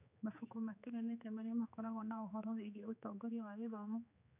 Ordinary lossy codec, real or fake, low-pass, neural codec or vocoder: none; fake; 3.6 kHz; codec, 16 kHz, 4 kbps, X-Codec, HuBERT features, trained on general audio